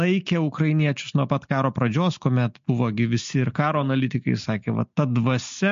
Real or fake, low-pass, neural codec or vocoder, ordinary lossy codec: real; 7.2 kHz; none; MP3, 48 kbps